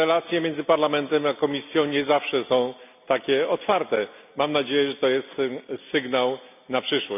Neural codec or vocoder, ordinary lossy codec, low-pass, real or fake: none; none; 3.6 kHz; real